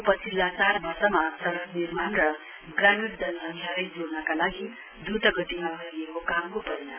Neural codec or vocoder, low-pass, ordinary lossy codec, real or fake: none; 3.6 kHz; none; real